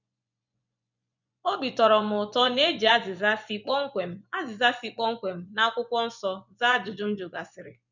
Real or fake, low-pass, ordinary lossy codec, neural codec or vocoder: fake; 7.2 kHz; none; vocoder, 24 kHz, 100 mel bands, Vocos